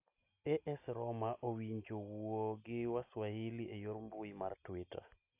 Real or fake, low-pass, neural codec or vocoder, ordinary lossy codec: real; 3.6 kHz; none; AAC, 32 kbps